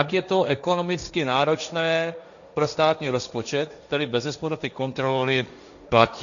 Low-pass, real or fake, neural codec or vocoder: 7.2 kHz; fake; codec, 16 kHz, 1.1 kbps, Voila-Tokenizer